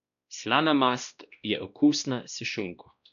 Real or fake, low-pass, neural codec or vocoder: fake; 7.2 kHz; codec, 16 kHz, 1 kbps, X-Codec, WavLM features, trained on Multilingual LibriSpeech